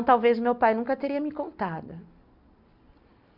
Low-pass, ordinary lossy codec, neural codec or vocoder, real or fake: 5.4 kHz; none; none; real